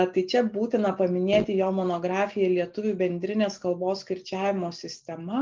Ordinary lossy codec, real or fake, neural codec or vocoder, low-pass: Opus, 24 kbps; real; none; 7.2 kHz